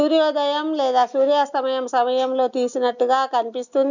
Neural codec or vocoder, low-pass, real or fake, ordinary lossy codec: none; 7.2 kHz; real; none